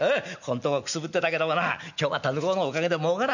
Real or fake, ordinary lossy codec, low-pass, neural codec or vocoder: real; none; 7.2 kHz; none